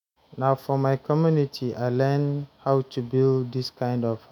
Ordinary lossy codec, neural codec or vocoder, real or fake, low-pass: none; autoencoder, 48 kHz, 128 numbers a frame, DAC-VAE, trained on Japanese speech; fake; 19.8 kHz